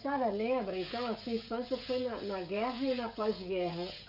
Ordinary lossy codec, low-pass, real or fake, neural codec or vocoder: none; 5.4 kHz; fake; codec, 16 kHz, 16 kbps, FreqCodec, smaller model